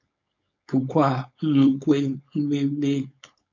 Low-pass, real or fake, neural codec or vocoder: 7.2 kHz; fake; codec, 16 kHz, 4.8 kbps, FACodec